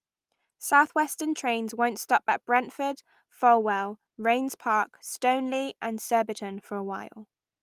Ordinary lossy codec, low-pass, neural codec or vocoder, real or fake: Opus, 32 kbps; 14.4 kHz; none; real